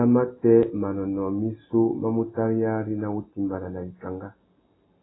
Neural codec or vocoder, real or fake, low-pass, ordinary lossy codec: none; real; 7.2 kHz; AAC, 16 kbps